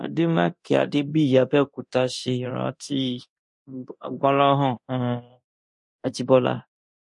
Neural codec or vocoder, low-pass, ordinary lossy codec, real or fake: codec, 24 kHz, 0.9 kbps, DualCodec; 10.8 kHz; MP3, 48 kbps; fake